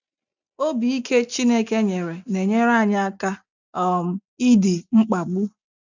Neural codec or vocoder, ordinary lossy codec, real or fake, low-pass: none; none; real; 7.2 kHz